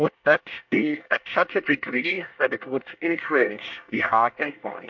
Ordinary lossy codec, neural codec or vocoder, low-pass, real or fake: MP3, 64 kbps; codec, 24 kHz, 1 kbps, SNAC; 7.2 kHz; fake